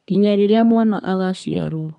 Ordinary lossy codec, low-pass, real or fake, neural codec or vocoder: none; 10.8 kHz; fake; codec, 24 kHz, 1 kbps, SNAC